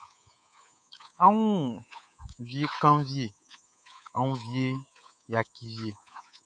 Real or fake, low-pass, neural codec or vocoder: fake; 9.9 kHz; codec, 24 kHz, 3.1 kbps, DualCodec